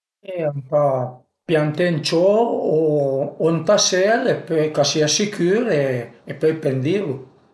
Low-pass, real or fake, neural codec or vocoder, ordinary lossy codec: none; real; none; none